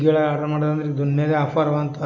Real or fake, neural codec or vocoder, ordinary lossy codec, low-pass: real; none; none; 7.2 kHz